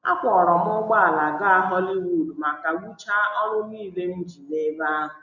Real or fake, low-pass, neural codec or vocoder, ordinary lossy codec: real; 7.2 kHz; none; none